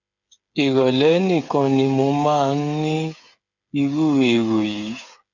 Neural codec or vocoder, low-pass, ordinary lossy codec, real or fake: codec, 16 kHz, 8 kbps, FreqCodec, smaller model; 7.2 kHz; none; fake